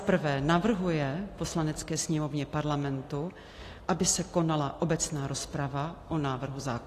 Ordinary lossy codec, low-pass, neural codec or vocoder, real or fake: AAC, 48 kbps; 14.4 kHz; none; real